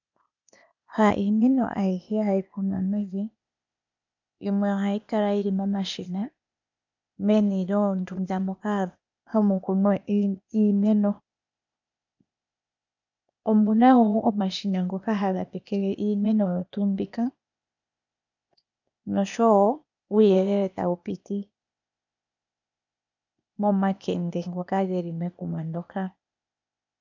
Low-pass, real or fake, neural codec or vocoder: 7.2 kHz; fake; codec, 16 kHz, 0.8 kbps, ZipCodec